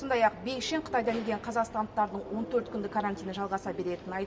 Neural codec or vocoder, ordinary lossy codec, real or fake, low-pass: none; none; real; none